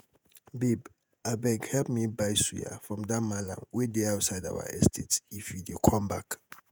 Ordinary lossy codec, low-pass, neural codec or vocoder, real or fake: none; none; vocoder, 48 kHz, 128 mel bands, Vocos; fake